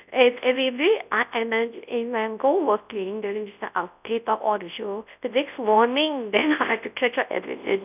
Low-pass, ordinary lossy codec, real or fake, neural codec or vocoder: 3.6 kHz; none; fake; codec, 24 kHz, 0.9 kbps, WavTokenizer, large speech release